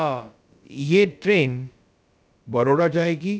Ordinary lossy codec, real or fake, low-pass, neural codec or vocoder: none; fake; none; codec, 16 kHz, about 1 kbps, DyCAST, with the encoder's durations